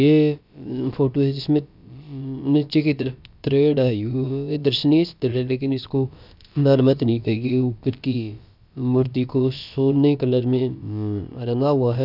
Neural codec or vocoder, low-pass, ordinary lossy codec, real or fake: codec, 16 kHz, about 1 kbps, DyCAST, with the encoder's durations; 5.4 kHz; none; fake